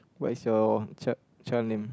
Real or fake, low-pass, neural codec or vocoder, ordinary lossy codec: real; none; none; none